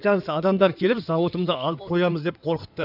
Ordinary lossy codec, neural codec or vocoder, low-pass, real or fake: none; codec, 16 kHz in and 24 kHz out, 2.2 kbps, FireRedTTS-2 codec; 5.4 kHz; fake